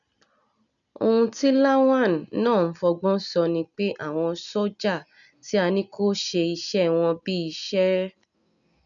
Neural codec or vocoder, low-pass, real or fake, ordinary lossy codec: none; 7.2 kHz; real; none